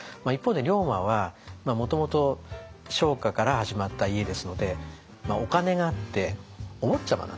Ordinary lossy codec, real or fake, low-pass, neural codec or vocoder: none; real; none; none